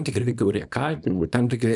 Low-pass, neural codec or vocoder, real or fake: 10.8 kHz; codec, 24 kHz, 0.9 kbps, WavTokenizer, small release; fake